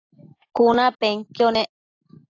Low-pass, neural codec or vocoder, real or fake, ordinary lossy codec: 7.2 kHz; none; real; AAC, 32 kbps